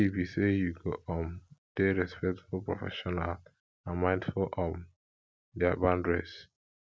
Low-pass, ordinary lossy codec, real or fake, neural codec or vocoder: none; none; real; none